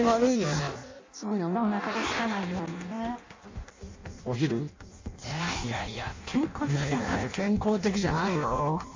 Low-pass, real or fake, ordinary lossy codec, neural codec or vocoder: 7.2 kHz; fake; MP3, 64 kbps; codec, 16 kHz in and 24 kHz out, 0.6 kbps, FireRedTTS-2 codec